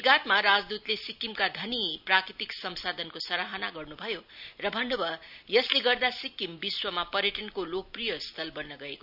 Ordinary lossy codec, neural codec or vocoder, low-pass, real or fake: none; none; 5.4 kHz; real